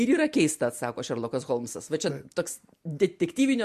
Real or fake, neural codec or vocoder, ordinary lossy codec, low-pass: real; none; MP3, 64 kbps; 14.4 kHz